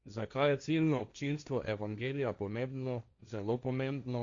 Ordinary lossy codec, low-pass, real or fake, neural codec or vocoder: Opus, 64 kbps; 7.2 kHz; fake; codec, 16 kHz, 1.1 kbps, Voila-Tokenizer